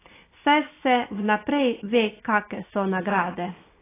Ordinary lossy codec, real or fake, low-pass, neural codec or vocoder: AAC, 16 kbps; real; 3.6 kHz; none